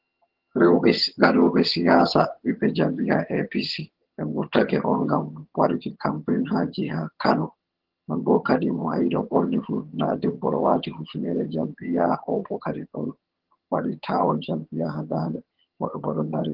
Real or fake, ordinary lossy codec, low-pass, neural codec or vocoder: fake; Opus, 16 kbps; 5.4 kHz; vocoder, 22.05 kHz, 80 mel bands, HiFi-GAN